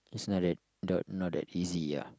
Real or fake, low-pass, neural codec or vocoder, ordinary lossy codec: real; none; none; none